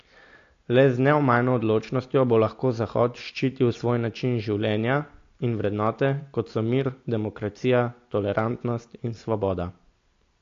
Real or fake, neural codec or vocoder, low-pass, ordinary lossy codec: real; none; 7.2 kHz; AAC, 48 kbps